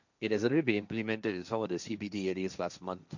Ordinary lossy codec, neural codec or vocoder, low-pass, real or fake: none; codec, 16 kHz, 1.1 kbps, Voila-Tokenizer; 7.2 kHz; fake